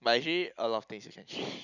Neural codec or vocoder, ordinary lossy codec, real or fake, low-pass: none; none; real; 7.2 kHz